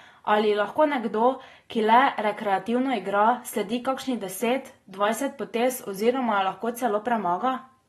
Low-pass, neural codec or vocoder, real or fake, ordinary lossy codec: 19.8 kHz; none; real; AAC, 32 kbps